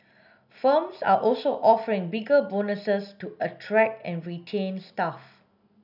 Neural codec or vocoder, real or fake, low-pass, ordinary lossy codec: none; real; 5.4 kHz; none